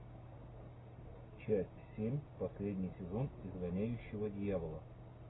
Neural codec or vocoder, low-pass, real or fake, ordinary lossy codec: none; 7.2 kHz; real; AAC, 16 kbps